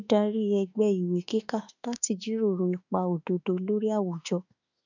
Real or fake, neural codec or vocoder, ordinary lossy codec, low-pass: fake; autoencoder, 48 kHz, 32 numbers a frame, DAC-VAE, trained on Japanese speech; none; 7.2 kHz